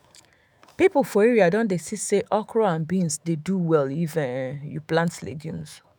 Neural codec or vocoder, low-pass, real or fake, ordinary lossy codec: autoencoder, 48 kHz, 128 numbers a frame, DAC-VAE, trained on Japanese speech; none; fake; none